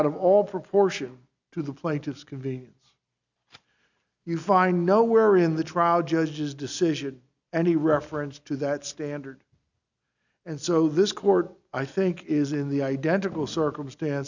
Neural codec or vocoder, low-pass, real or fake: none; 7.2 kHz; real